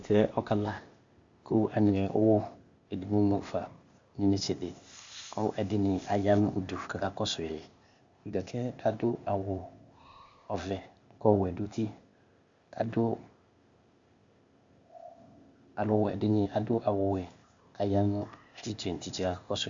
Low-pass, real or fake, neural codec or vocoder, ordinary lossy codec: 7.2 kHz; fake; codec, 16 kHz, 0.8 kbps, ZipCodec; Opus, 64 kbps